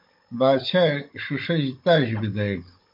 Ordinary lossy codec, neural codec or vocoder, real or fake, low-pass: MP3, 32 kbps; codec, 16 kHz, 16 kbps, FunCodec, trained on Chinese and English, 50 frames a second; fake; 5.4 kHz